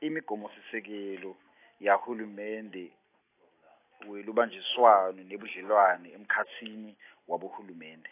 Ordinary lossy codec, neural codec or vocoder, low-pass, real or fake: AAC, 24 kbps; none; 3.6 kHz; real